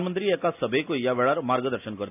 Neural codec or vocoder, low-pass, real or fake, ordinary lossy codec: none; 3.6 kHz; real; none